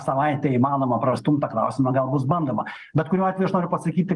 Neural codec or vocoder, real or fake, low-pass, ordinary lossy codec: none; real; 10.8 kHz; Opus, 32 kbps